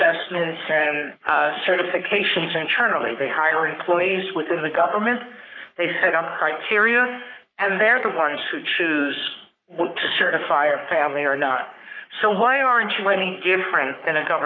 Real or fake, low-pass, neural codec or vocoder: fake; 7.2 kHz; codec, 44.1 kHz, 3.4 kbps, Pupu-Codec